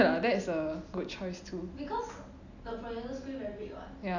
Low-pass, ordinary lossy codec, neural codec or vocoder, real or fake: 7.2 kHz; none; none; real